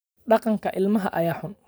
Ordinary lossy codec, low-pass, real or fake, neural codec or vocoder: none; none; real; none